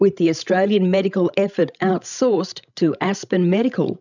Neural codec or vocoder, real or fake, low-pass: codec, 16 kHz, 16 kbps, FreqCodec, larger model; fake; 7.2 kHz